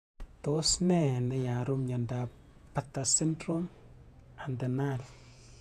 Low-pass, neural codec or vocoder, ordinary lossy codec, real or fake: 14.4 kHz; vocoder, 48 kHz, 128 mel bands, Vocos; none; fake